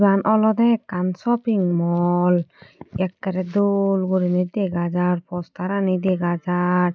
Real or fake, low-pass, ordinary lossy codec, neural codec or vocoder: real; none; none; none